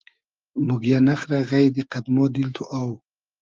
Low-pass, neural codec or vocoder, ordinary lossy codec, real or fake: 7.2 kHz; codec, 16 kHz, 6 kbps, DAC; Opus, 32 kbps; fake